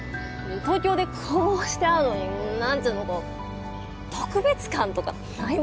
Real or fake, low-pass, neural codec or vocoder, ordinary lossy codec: real; none; none; none